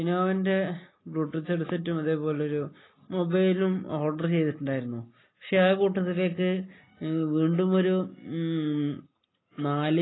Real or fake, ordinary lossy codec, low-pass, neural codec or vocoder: real; AAC, 16 kbps; 7.2 kHz; none